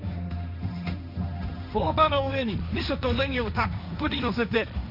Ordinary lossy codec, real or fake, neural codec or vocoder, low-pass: none; fake; codec, 16 kHz, 1.1 kbps, Voila-Tokenizer; 5.4 kHz